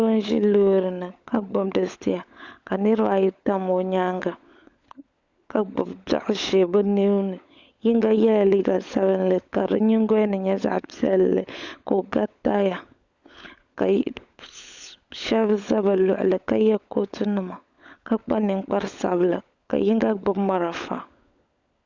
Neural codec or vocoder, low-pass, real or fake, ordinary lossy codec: codec, 16 kHz, 16 kbps, FunCodec, trained on LibriTTS, 50 frames a second; 7.2 kHz; fake; Opus, 64 kbps